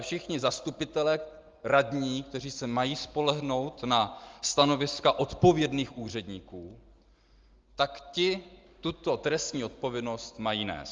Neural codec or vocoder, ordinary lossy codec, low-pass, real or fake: none; Opus, 32 kbps; 7.2 kHz; real